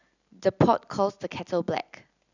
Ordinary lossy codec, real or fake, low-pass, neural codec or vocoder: none; real; 7.2 kHz; none